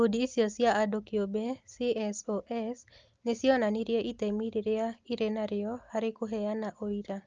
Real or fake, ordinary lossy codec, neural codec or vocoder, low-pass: real; Opus, 24 kbps; none; 7.2 kHz